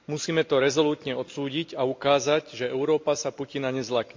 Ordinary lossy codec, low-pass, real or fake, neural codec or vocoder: MP3, 48 kbps; 7.2 kHz; real; none